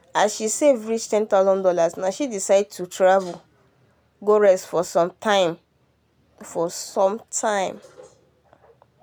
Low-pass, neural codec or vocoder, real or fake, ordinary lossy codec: none; none; real; none